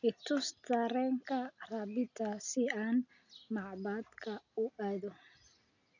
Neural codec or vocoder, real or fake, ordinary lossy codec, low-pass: none; real; none; 7.2 kHz